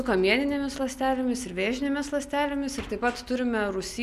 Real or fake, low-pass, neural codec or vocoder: real; 14.4 kHz; none